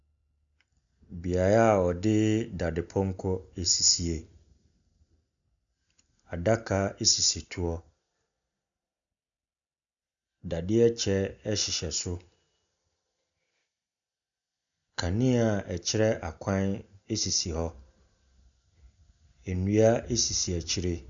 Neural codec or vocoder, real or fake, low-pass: none; real; 7.2 kHz